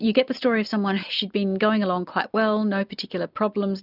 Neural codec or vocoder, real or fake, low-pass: none; real; 5.4 kHz